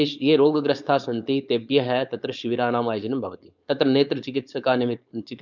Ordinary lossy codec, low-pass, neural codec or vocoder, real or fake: none; 7.2 kHz; codec, 16 kHz, 4.8 kbps, FACodec; fake